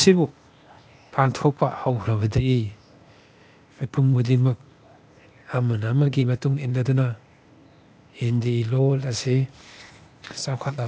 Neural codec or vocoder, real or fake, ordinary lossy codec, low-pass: codec, 16 kHz, 0.8 kbps, ZipCodec; fake; none; none